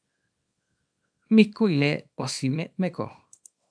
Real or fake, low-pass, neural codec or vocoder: fake; 9.9 kHz; codec, 24 kHz, 0.9 kbps, WavTokenizer, small release